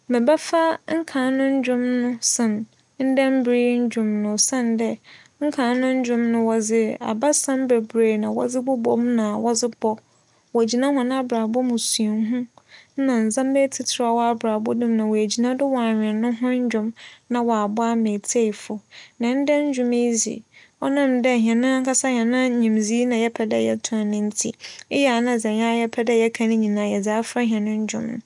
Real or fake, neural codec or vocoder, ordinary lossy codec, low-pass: real; none; none; 10.8 kHz